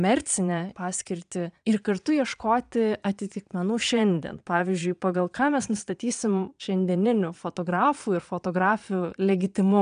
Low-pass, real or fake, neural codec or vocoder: 9.9 kHz; fake; vocoder, 22.05 kHz, 80 mel bands, Vocos